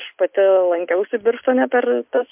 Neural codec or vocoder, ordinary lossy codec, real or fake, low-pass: none; MP3, 32 kbps; real; 3.6 kHz